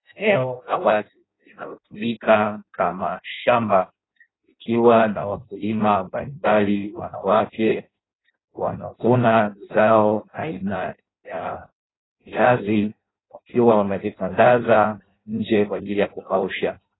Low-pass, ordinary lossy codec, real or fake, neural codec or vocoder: 7.2 kHz; AAC, 16 kbps; fake; codec, 16 kHz in and 24 kHz out, 0.6 kbps, FireRedTTS-2 codec